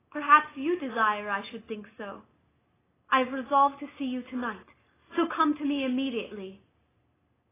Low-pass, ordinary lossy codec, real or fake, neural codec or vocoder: 3.6 kHz; AAC, 16 kbps; real; none